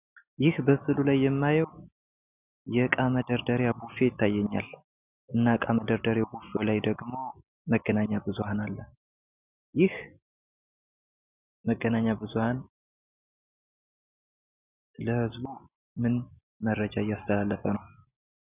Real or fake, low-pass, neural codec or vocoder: real; 3.6 kHz; none